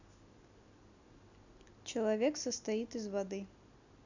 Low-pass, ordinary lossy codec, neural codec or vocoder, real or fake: 7.2 kHz; none; none; real